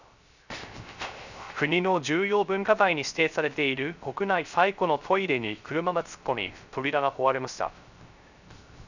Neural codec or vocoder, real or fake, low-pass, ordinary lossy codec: codec, 16 kHz, 0.3 kbps, FocalCodec; fake; 7.2 kHz; none